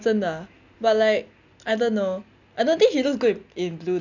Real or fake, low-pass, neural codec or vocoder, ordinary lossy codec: real; 7.2 kHz; none; none